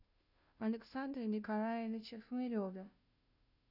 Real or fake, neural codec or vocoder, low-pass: fake; codec, 16 kHz, 0.5 kbps, FunCodec, trained on Chinese and English, 25 frames a second; 5.4 kHz